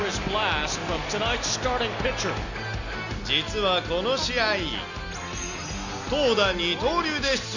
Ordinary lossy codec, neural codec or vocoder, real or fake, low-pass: none; none; real; 7.2 kHz